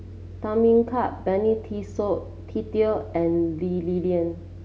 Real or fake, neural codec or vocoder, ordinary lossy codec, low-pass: real; none; none; none